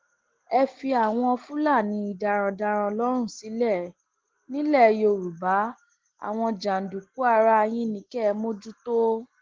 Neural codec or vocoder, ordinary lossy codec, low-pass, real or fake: none; Opus, 16 kbps; 7.2 kHz; real